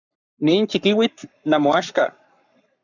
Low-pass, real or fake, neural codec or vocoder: 7.2 kHz; fake; codec, 44.1 kHz, 7.8 kbps, Pupu-Codec